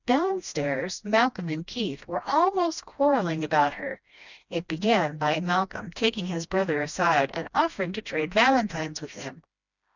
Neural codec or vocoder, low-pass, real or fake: codec, 16 kHz, 1 kbps, FreqCodec, smaller model; 7.2 kHz; fake